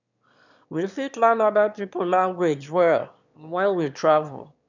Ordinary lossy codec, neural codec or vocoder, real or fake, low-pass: none; autoencoder, 22.05 kHz, a latent of 192 numbers a frame, VITS, trained on one speaker; fake; 7.2 kHz